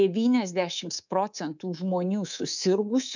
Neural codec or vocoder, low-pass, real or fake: codec, 24 kHz, 3.1 kbps, DualCodec; 7.2 kHz; fake